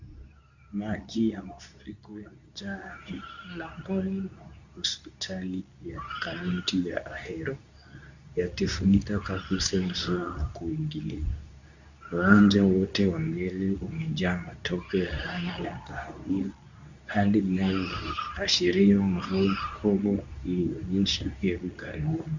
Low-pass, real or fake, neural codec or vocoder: 7.2 kHz; fake; codec, 24 kHz, 0.9 kbps, WavTokenizer, medium speech release version 2